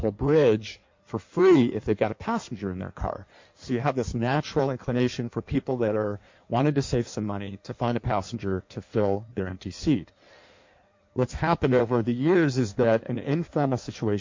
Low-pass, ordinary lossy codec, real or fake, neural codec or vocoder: 7.2 kHz; MP3, 48 kbps; fake; codec, 16 kHz in and 24 kHz out, 1.1 kbps, FireRedTTS-2 codec